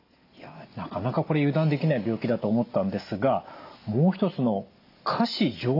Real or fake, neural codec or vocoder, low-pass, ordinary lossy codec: real; none; 5.4 kHz; AAC, 32 kbps